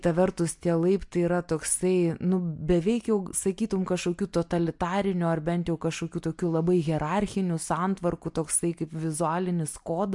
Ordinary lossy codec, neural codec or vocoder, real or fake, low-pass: MP3, 64 kbps; none; real; 10.8 kHz